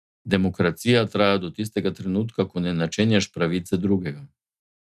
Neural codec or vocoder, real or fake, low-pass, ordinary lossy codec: none; real; 14.4 kHz; none